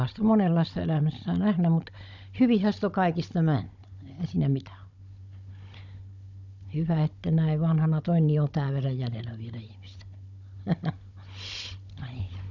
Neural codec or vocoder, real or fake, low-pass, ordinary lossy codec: codec, 16 kHz, 16 kbps, FunCodec, trained on Chinese and English, 50 frames a second; fake; 7.2 kHz; none